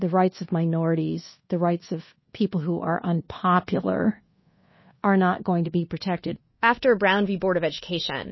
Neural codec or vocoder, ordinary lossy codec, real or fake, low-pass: codec, 24 kHz, 1.2 kbps, DualCodec; MP3, 24 kbps; fake; 7.2 kHz